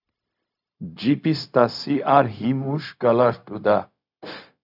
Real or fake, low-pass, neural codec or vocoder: fake; 5.4 kHz; codec, 16 kHz, 0.4 kbps, LongCat-Audio-Codec